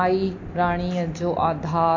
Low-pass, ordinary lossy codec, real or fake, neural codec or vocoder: 7.2 kHz; MP3, 48 kbps; real; none